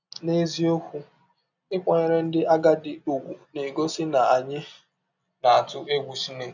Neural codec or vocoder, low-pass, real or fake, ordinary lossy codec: none; 7.2 kHz; real; none